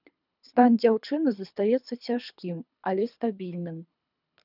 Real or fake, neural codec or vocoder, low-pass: fake; codec, 24 kHz, 3 kbps, HILCodec; 5.4 kHz